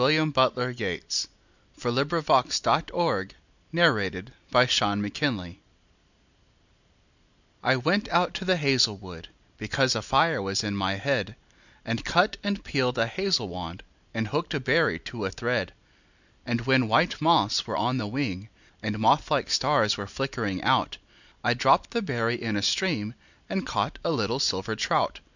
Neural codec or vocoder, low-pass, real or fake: none; 7.2 kHz; real